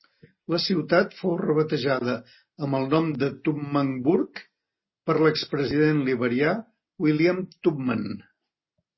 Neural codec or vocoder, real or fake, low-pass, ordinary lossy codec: none; real; 7.2 kHz; MP3, 24 kbps